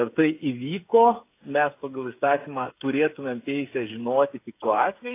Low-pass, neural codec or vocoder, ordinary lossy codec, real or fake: 3.6 kHz; codec, 16 kHz, 8 kbps, FreqCodec, smaller model; AAC, 24 kbps; fake